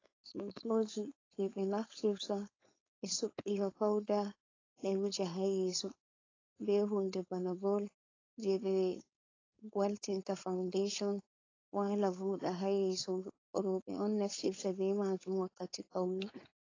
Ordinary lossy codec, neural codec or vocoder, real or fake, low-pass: AAC, 32 kbps; codec, 16 kHz, 4.8 kbps, FACodec; fake; 7.2 kHz